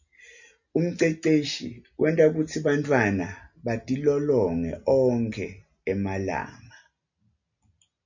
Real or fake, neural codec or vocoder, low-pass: real; none; 7.2 kHz